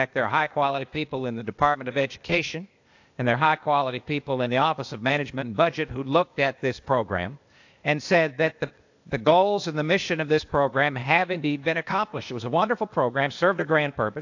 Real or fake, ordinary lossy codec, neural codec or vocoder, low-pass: fake; AAC, 48 kbps; codec, 16 kHz, 0.8 kbps, ZipCodec; 7.2 kHz